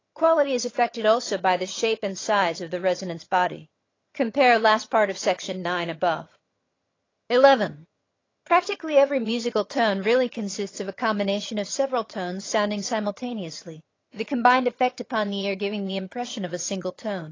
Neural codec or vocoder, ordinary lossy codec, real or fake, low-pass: vocoder, 22.05 kHz, 80 mel bands, HiFi-GAN; AAC, 32 kbps; fake; 7.2 kHz